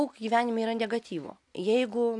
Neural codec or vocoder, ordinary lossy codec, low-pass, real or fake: none; AAC, 64 kbps; 10.8 kHz; real